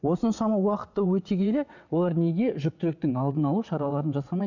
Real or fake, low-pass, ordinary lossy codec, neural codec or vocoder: fake; 7.2 kHz; none; vocoder, 22.05 kHz, 80 mel bands, WaveNeXt